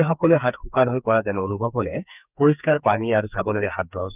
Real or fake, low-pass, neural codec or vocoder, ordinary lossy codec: fake; 3.6 kHz; codec, 44.1 kHz, 2.6 kbps, SNAC; none